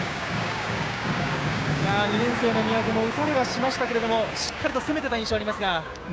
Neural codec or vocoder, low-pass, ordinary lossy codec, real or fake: codec, 16 kHz, 6 kbps, DAC; none; none; fake